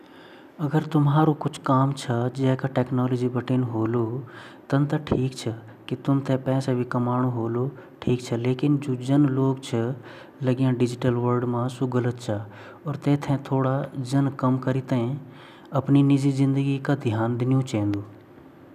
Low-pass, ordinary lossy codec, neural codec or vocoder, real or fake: 14.4 kHz; none; none; real